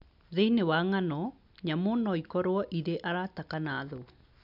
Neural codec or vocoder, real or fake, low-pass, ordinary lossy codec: none; real; 5.4 kHz; none